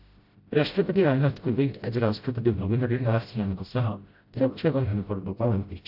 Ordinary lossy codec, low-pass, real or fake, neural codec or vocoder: AAC, 48 kbps; 5.4 kHz; fake; codec, 16 kHz, 0.5 kbps, FreqCodec, smaller model